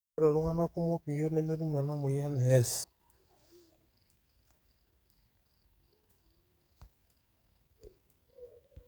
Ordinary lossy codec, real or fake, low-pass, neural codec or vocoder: none; fake; none; codec, 44.1 kHz, 2.6 kbps, SNAC